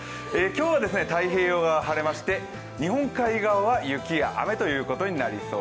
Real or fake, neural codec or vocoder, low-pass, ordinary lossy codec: real; none; none; none